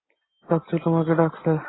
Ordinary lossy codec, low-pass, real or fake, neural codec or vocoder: AAC, 16 kbps; 7.2 kHz; real; none